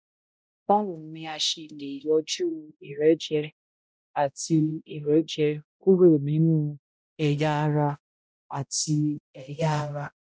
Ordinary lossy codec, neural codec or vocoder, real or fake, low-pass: none; codec, 16 kHz, 0.5 kbps, X-Codec, HuBERT features, trained on balanced general audio; fake; none